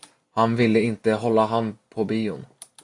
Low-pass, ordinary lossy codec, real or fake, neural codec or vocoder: 10.8 kHz; AAC, 64 kbps; real; none